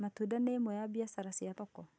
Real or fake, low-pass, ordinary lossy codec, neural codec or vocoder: real; none; none; none